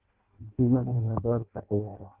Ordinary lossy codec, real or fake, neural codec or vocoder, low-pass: Opus, 16 kbps; fake; codec, 16 kHz in and 24 kHz out, 0.6 kbps, FireRedTTS-2 codec; 3.6 kHz